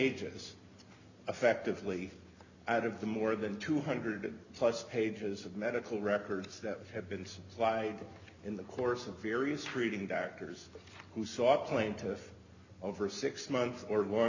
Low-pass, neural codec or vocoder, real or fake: 7.2 kHz; none; real